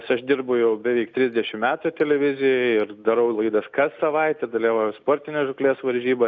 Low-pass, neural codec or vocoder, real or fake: 7.2 kHz; none; real